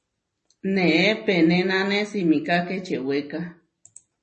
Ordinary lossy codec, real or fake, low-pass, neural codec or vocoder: MP3, 32 kbps; real; 10.8 kHz; none